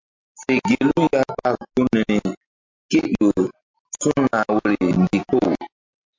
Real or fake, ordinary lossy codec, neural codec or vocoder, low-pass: real; MP3, 48 kbps; none; 7.2 kHz